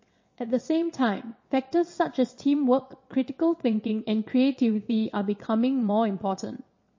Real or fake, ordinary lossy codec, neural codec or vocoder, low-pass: fake; MP3, 32 kbps; vocoder, 22.05 kHz, 80 mel bands, WaveNeXt; 7.2 kHz